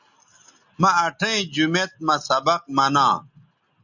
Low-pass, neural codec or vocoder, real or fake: 7.2 kHz; none; real